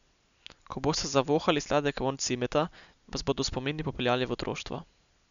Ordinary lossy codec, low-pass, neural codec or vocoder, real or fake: none; 7.2 kHz; none; real